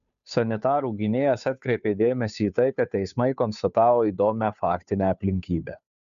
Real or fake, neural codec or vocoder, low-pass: fake; codec, 16 kHz, 2 kbps, FunCodec, trained on Chinese and English, 25 frames a second; 7.2 kHz